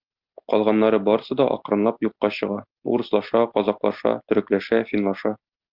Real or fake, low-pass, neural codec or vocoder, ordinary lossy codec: real; 5.4 kHz; none; Opus, 24 kbps